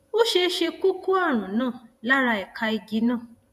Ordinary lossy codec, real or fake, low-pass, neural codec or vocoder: none; fake; 14.4 kHz; vocoder, 48 kHz, 128 mel bands, Vocos